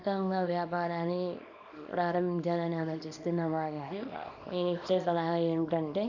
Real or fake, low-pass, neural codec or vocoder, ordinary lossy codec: fake; 7.2 kHz; codec, 24 kHz, 0.9 kbps, WavTokenizer, small release; none